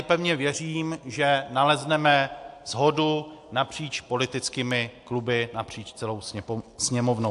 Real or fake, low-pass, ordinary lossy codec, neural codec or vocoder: real; 10.8 kHz; AAC, 64 kbps; none